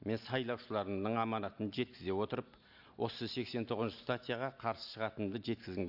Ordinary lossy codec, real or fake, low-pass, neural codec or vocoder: AAC, 48 kbps; real; 5.4 kHz; none